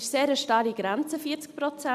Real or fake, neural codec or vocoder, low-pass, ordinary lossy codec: real; none; 14.4 kHz; AAC, 96 kbps